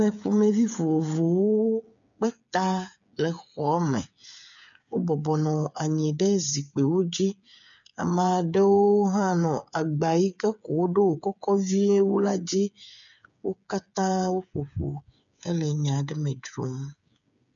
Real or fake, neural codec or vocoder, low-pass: fake; codec, 16 kHz, 8 kbps, FreqCodec, smaller model; 7.2 kHz